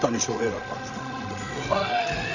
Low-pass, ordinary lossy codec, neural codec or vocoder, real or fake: 7.2 kHz; none; codec, 16 kHz, 16 kbps, FreqCodec, larger model; fake